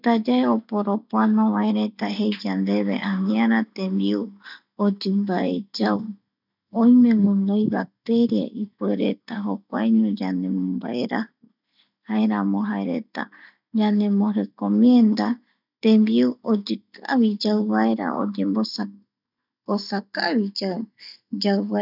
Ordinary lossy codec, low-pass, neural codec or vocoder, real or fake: none; 5.4 kHz; none; real